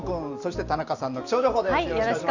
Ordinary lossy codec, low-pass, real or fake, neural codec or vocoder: Opus, 64 kbps; 7.2 kHz; real; none